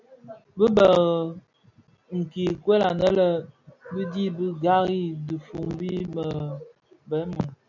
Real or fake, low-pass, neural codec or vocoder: real; 7.2 kHz; none